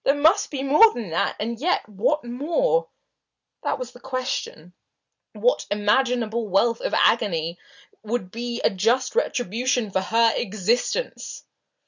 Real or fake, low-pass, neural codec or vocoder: real; 7.2 kHz; none